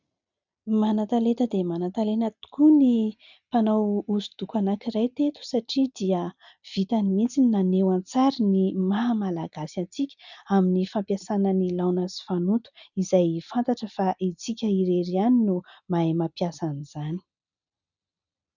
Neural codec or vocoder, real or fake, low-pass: none; real; 7.2 kHz